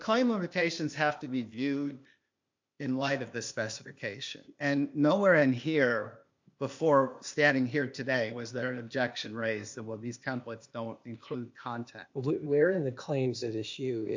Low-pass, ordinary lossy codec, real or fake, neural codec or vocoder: 7.2 kHz; MP3, 48 kbps; fake; codec, 16 kHz, 0.8 kbps, ZipCodec